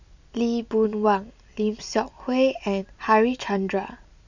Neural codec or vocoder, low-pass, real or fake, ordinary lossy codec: none; 7.2 kHz; real; none